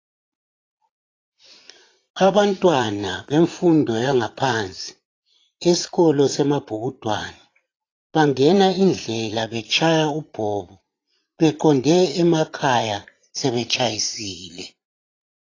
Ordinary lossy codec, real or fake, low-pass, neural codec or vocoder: AAC, 32 kbps; fake; 7.2 kHz; vocoder, 44.1 kHz, 80 mel bands, Vocos